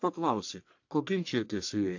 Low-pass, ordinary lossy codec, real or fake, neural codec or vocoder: 7.2 kHz; AAC, 48 kbps; fake; codec, 44.1 kHz, 1.7 kbps, Pupu-Codec